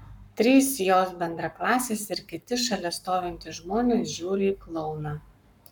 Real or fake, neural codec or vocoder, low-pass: fake; codec, 44.1 kHz, 7.8 kbps, Pupu-Codec; 19.8 kHz